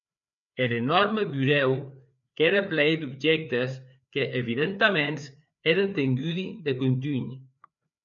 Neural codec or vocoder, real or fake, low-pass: codec, 16 kHz, 4 kbps, FreqCodec, larger model; fake; 7.2 kHz